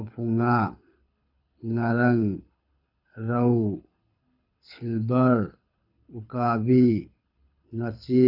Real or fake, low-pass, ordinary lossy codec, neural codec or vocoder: fake; 5.4 kHz; none; codec, 24 kHz, 6 kbps, HILCodec